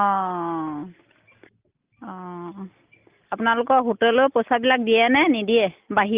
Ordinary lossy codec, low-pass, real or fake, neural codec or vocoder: Opus, 32 kbps; 3.6 kHz; real; none